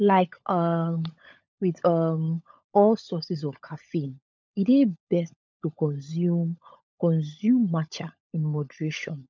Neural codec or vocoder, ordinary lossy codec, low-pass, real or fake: codec, 16 kHz, 16 kbps, FunCodec, trained on LibriTTS, 50 frames a second; none; none; fake